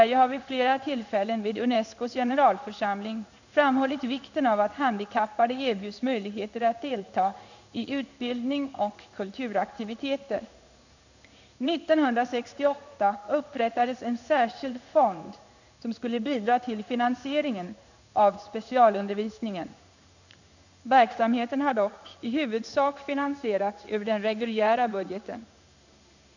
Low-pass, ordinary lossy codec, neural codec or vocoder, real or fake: 7.2 kHz; none; codec, 16 kHz in and 24 kHz out, 1 kbps, XY-Tokenizer; fake